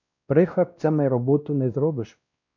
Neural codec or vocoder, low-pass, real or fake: codec, 16 kHz, 1 kbps, X-Codec, WavLM features, trained on Multilingual LibriSpeech; 7.2 kHz; fake